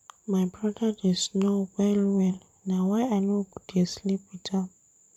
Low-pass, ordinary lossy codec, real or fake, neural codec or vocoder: 19.8 kHz; none; fake; vocoder, 44.1 kHz, 128 mel bands every 512 samples, BigVGAN v2